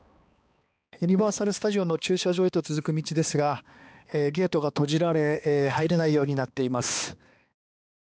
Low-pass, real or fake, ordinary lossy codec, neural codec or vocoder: none; fake; none; codec, 16 kHz, 2 kbps, X-Codec, HuBERT features, trained on balanced general audio